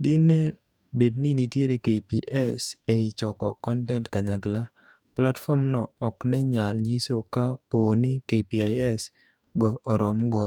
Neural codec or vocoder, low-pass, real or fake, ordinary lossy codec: codec, 44.1 kHz, 2.6 kbps, DAC; 19.8 kHz; fake; none